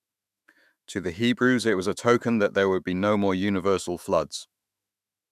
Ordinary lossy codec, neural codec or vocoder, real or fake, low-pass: none; autoencoder, 48 kHz, 128 numbers a frame, DAC-VAE, trained on Japanese speech; fake; 14.4 kHz